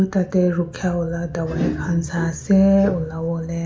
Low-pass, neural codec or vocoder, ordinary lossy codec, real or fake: none; none; none; real